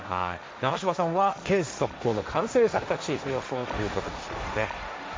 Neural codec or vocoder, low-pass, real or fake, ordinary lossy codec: codec, 16 kHz, 1.1 kbps, Voila-Tokenizer; none; fake; none